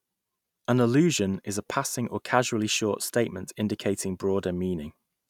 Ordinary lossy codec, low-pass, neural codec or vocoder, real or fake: none; 19.8 kHz; none; real